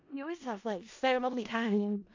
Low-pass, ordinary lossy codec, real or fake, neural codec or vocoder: 7.2 kHz; AAC, 48 kbps; fake; codec, 16 kHz in and 24 kHz out, 0.4 kbps, LongCat-Audio-Codec, four codebook decoder